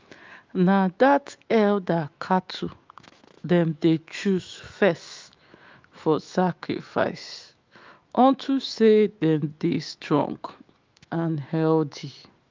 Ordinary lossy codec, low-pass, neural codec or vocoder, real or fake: Opus, 32 kbps; 7.2 kHz; autoencoder, 48 kHz, 128 numbers a frame, DAC-VAE, trained on Japanese speech; fake